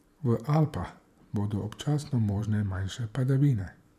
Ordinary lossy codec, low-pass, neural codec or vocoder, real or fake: none; 14.4 kHz; vocoder, 44.1 kHz, 128 mel bands every 512 samples, BigVGAN v2; fake